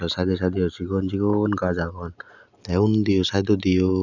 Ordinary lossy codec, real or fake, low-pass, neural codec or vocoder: none; real; 7.2 kHz; none